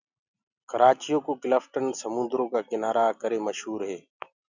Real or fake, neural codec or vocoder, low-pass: real; none; 7.2 kHz